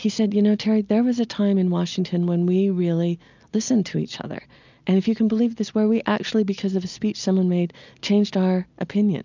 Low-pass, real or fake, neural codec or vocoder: 7.2 kHz; real; none